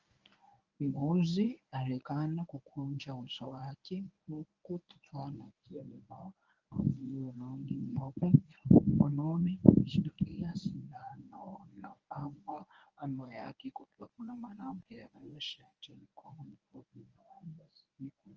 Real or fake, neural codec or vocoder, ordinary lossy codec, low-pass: fake; codec, 24 kHz, 0.9 kbps, WavTokenizer, medium speech release version 1; Opus, 24 kbps; 7.2 kHz